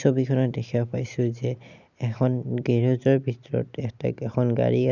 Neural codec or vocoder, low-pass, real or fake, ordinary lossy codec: none; 7.2 kHz; real; none